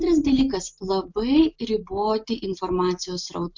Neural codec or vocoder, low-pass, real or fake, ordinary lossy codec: none; 7.2 kHz; real; MP3, 64 kbps